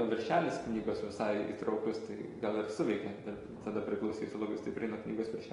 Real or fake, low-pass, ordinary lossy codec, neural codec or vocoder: real; 10.8 kHz; AAC, 32 kbps; none